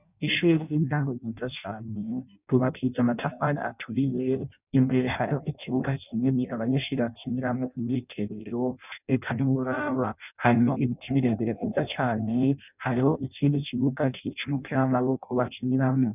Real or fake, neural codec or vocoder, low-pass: fake; codec, 16 kHz in and 24 kHz out, 0.6 kbps, FireRedTTS-2 codec; 3.6 kHz